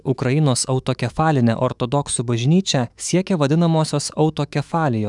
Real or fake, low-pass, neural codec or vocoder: fake; 10.8 kHz; autoencoder, 48 kHz, 128 numbers a frame, DAC-VAE, trained on Japanese speech